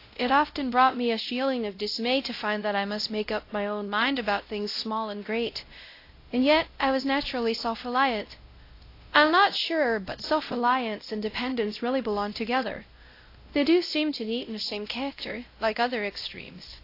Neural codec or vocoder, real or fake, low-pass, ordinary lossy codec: codec, 16 kHz, 0.5 kbps, X-Codec, WavLM features, trained on Multilingual LibriSpeech; fake; 5.4 kHz; AAC, 32 kbps